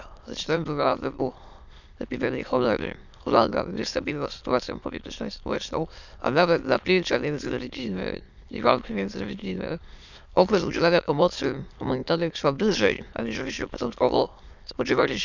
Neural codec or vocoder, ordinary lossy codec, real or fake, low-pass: autoencoder, 22.05 kHz, a latent of 192 numbers a frame, VITS, trained on many speakers; none; fake; 7.2 kHz